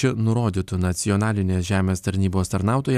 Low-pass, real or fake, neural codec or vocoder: 14.4 kHz; fake; vocoder, 44.1 kHz, 128 mel bands every 256 samples, BigVGAN v2